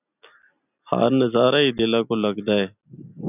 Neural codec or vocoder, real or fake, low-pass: none; real; 3.6 kHz